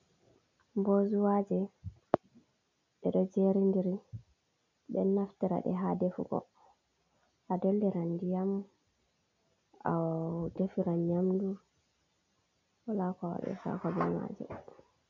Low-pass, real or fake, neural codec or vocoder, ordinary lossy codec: 7.2 kHz; real; none; MP3, 64 kbps